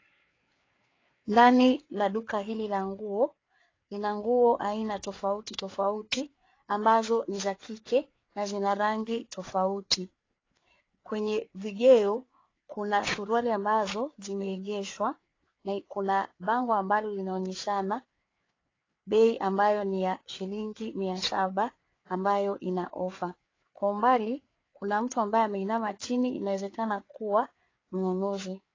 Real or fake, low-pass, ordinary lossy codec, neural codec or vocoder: fake; 7.2 kHz; AAC, 32 kbps; codec, 16 kHz, 4 kbps, FreqCodec, larger model